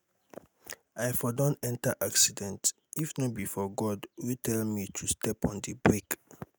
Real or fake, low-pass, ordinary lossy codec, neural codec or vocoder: real; none; none; none